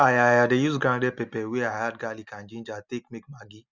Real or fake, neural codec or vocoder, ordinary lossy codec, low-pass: real; none; Opus, 64 kbps; 7.2 kHz